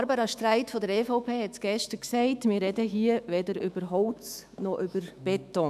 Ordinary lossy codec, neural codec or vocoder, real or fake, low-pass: none; autoencoder, 48 kHz, 128 numbers a frame, DAC-VAE, trained on Japanese speech; fake; 14.4 kHz